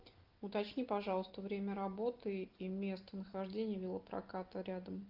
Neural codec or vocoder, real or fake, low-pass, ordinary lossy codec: none; real; 5.4 kHz; Opus, 32 kbps